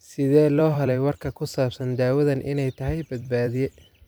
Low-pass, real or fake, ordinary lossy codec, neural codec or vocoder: none; fake; none; vocoder, 44.1 kHz, 128 mel bands every 256 samples, BigVGAN v2